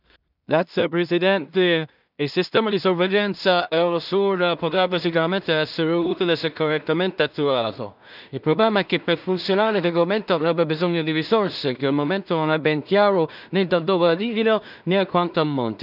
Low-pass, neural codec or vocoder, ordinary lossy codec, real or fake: 5.4 kHz; codec, 16 kHz in and 24 kHz out, 0.4 kbps, LongCat-Audio-Codec, two codebook decoder; none; fake